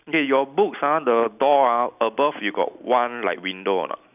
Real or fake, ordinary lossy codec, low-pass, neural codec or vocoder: real; none; 3.6 kHz; none